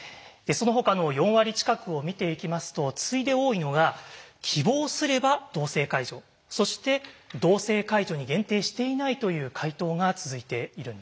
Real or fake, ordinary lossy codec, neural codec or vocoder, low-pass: real; none; none; none